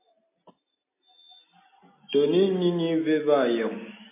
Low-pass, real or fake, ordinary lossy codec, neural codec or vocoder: 3.6 kHz; real; MP3, 24 kbps; none